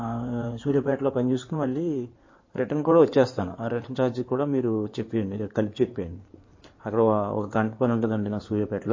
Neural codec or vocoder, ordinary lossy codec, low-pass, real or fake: codec, 16 kHz in and 24 kHz out, 2.2 kbps, FireRedTTS-2 codec; MP3, 32 kbps; 7.2 kHz; fake